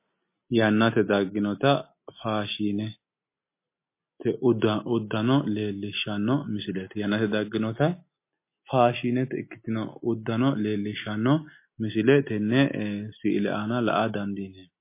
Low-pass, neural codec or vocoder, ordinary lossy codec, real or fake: 3.6 kHz; none; MP3, 24 kbps; real